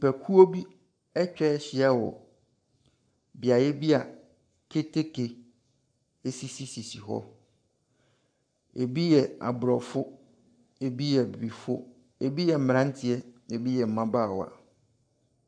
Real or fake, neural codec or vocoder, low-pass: fake; codec, 44.1 kHz, 7.8 kbps, Pupu-Codec; 9.9 kHz